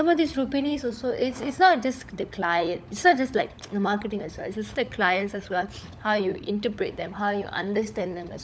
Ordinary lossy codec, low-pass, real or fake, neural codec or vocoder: none; none; fake; codec, 16 kHz, 16 kbps, FunCodec, trained on LibriTTS, 50 frames a second